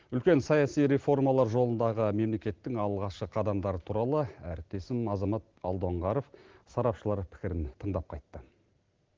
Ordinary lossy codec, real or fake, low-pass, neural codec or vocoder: Opus, 16 kbps; real; 7.2 kHz; none